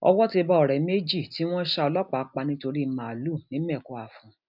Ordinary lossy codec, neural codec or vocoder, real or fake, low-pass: none; none; real; 5.4 kHz